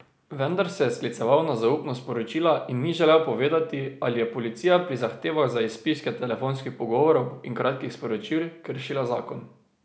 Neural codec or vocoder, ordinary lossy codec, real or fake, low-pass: none; none; real; none